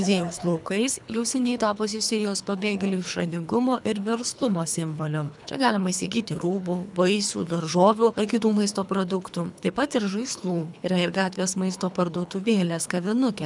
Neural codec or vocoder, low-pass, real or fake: codec, 24 kHz, 3 kbps, HILCodec; 10.8 kHz; fake